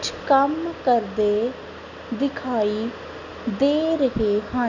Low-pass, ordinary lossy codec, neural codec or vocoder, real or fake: 7.2 kHz; none; none; real